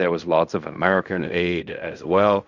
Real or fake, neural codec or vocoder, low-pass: fake; codec, 16 kHz in and 24 kHz out, 0.4 kbps, LongCat-Audio-Codec, fine tuned four codebook decoder; 7.2 kHz